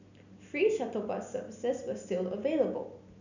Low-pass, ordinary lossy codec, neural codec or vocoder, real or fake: 7.2 kHz; none; none; real